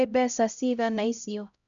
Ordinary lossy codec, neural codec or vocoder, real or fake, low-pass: none; codec, 16 kHz, 0.5 kbps, X-Codec, HuBERT features, trained on LibriSpeech; fake; 7.2 kHz